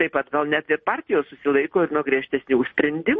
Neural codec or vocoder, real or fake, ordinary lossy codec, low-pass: none; real; MP3, 32 kbps; 10.8 kHz